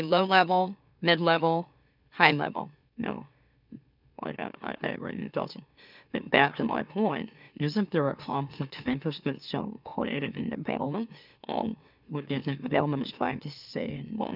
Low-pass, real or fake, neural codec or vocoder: 5.4 kHz; fake; autoencoder, 44.1 kHz, a latent of 192 numbers a frame, MeloTTS